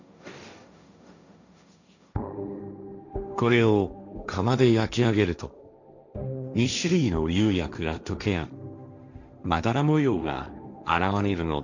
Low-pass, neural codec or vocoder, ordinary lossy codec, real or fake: 7.2 kHz; codec, 16 kHz, 1.1 kbps, Voila-Tokenizer; none; fake